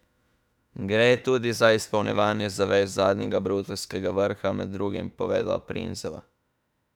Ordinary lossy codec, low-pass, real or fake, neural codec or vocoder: none; 19.8 kHz; fake; autoencoder, 48 kHz, 32 numbers a frame, DAC-VAE, trained on Japanese speech